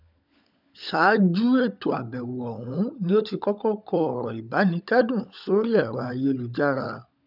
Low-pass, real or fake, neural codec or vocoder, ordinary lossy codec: 5.4 kHz; fake; codec, 16 kHz, 16 kbps, FunCodec, trained on LibriTTS, 50 frames a second; none